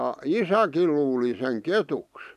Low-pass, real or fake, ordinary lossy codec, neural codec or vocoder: 14.4 kHz; real; none; none